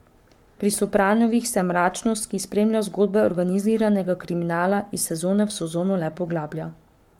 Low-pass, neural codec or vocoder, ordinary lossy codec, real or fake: 19.8 kHz; codec, 44.1 kHz, 7.8 kbps, Pupu-Codec; MP3, 96 kbps; fake